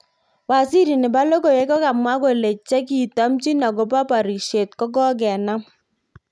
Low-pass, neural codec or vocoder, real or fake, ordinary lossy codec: none; none; real; none